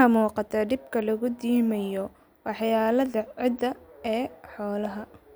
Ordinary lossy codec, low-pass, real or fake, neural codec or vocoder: none; none; real; none